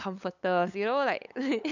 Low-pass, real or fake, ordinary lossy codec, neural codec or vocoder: 7.2 kHz; fake; none; codec, 16 kHz, 8 kbps, FunCodec, trained on LibriTTS, 25 frames a second